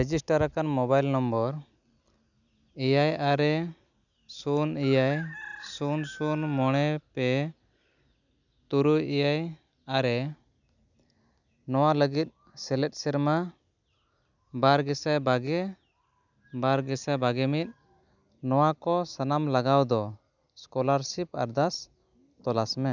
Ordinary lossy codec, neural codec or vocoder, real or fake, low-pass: none; none; real; 7.2 kHz